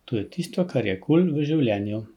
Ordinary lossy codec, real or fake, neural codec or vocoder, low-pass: none; real; none; 19.8 kHz